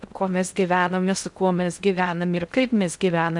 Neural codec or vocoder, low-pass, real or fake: codec, 16 kHz in and 24 kHz out, 0.6 kbps, FocalCodec, streaming, 2048 codes; 10.8 kHz; fake